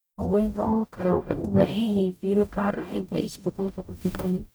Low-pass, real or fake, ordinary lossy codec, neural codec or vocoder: none; fake; none; codec, 44.1 kHz, 0.9 kbps, DAC